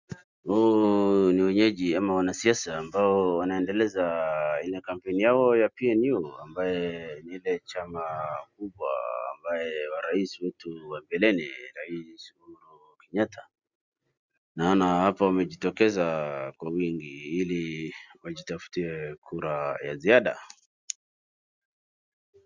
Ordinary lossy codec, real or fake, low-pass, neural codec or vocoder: Opus, 64 kbps; real; 7.2 kHz; none